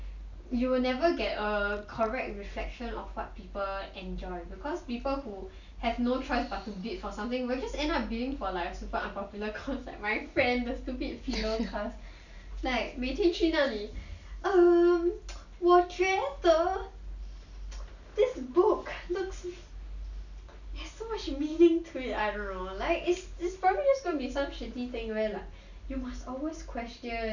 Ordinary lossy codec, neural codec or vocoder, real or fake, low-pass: none; none; real; 7.2 kHz